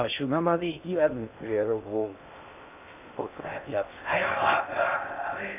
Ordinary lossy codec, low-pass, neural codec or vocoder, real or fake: none; 3.6 kHz; codec, 16 kHz in and 24 kHz out, 0.6 kbps, FocalCodec, streaming, 2048 codes; fake